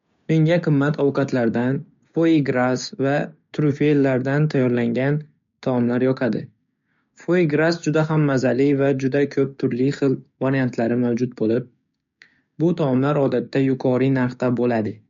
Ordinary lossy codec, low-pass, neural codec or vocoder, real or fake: MP3, 48 kbps; 7.2 kHz; codec, 16 kHz, 6 kbps, DAC; fake